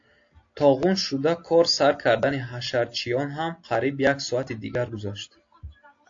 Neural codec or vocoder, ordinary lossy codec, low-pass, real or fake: none; AAC, 48 kbps; 7.2 kHz; real